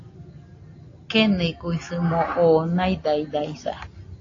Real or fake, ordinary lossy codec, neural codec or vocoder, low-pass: real; AAC, 32 kbps; none; 7.2 kHz